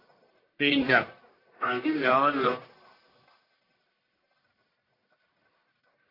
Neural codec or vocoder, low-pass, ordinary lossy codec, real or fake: codec, 44.1 kHz, 1.7 kbps, Pupu-Codec; 5.4 kHz; AAC, 24 kbps; fake